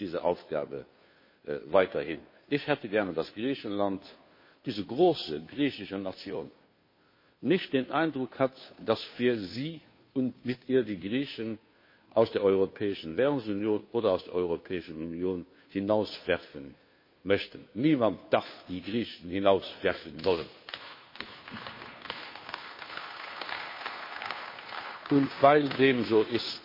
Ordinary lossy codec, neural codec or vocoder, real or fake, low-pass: MP3, 24 kbps; codec, 16 kHz, 2 kbps, FunCodec, trained on Chinese and English, 25 frames a second; fake; 5.4 kHz